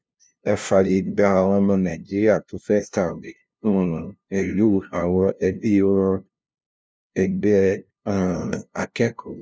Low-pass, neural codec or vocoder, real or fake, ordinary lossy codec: none; codec, 16 kHz, 0.5 kbps, FunCodec, trained on LibriTTS, 25 frames a second; fake; none